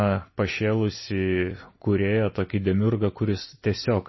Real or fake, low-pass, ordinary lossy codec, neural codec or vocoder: real; 7.2 kHz; MP3, 24 kbps; none